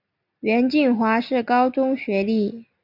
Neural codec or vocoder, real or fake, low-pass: none; real; 5.4 kHz